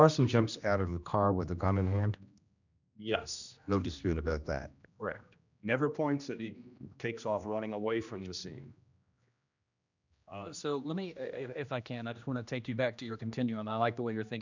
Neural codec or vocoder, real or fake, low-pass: codec, 16 kHz, 1 kbps, X-Codec, HuBERT features, trained on general audio; fake; 7.2 kHz